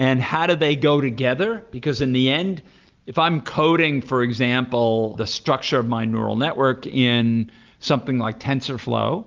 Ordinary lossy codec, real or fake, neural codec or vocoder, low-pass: Opus, 24 kbps; real; none; 7.2 kHz